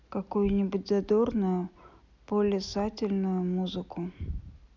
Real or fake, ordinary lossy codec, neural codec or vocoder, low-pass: real; none; none; 7.2 kHz